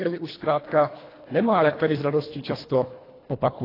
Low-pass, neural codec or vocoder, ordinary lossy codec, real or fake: 5.4 kHz; codec, 24 kHz, 1.5 kbps, HILCodec; AAC, 24 kbps; fake